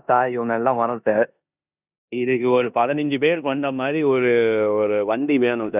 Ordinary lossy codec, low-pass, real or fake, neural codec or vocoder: none; 3.6 kHz; fake; codec, 16 kHz in and 24 kHz out, 0.9 kbps, LongCat-Audio-Codec, four codebook decoder